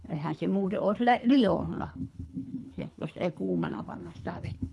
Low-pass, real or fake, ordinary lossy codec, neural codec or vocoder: none; fake; none; codec, 24 kHz, 3 kbps, HILCodec